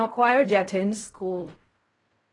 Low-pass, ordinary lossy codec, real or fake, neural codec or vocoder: 10.8 kHz; AAC, 32 kbps; fake; codec, 16 kHz in and 24 kHz out, 0.4 kbps, LongCat-Audio-Codec, fine tuned four codebook decoder